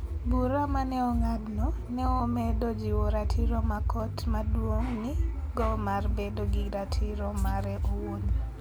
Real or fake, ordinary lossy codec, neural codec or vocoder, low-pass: real; none; none; none